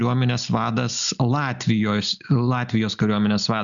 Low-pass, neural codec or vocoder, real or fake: 7.2 kHz; none; real